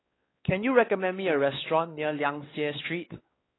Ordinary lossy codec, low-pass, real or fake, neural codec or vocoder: AAC, 16 kbps; 7.2 kHz; fake; codec, 16 kHz, 4 kbps, X-Codec, WavLM features, trained on Multilingual LibriSpeech